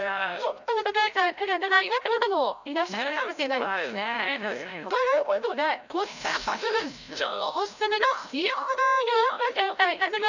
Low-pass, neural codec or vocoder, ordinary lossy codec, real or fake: 7.2 kHz; codec, 16 kHz, 0.5 kbps, FreqCodec, larger model; none; fake